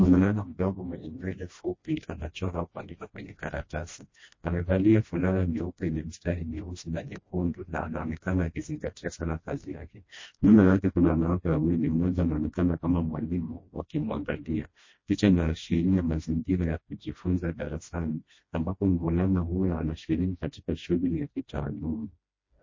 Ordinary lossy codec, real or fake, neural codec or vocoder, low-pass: MP3, 32 kbps; fake; codec, 16 kHz, 1 kbps, FreqCodec, smaller model; 7.2 kHz